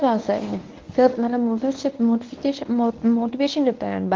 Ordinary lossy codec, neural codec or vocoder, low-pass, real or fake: Opus, 32 kbps; codec, 24 kHz, 0.9 kbps, WavTokenizer, medium speech release version 1; 7.2 kHz; fake